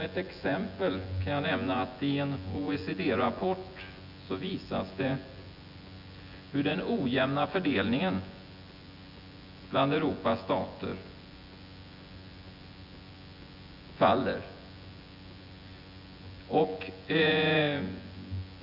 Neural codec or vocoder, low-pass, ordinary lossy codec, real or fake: vocoder, 24 kHz, 100 mel bands, Vocos; 5.4 kHz; none; fake